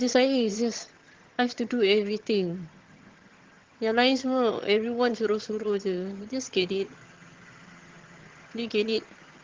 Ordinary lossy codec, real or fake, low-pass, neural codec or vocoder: Opus, 16 kbps; fake; 7.2 kHz; vocoder, 22.05 kHz, 80 mel bands, HiFi-GAN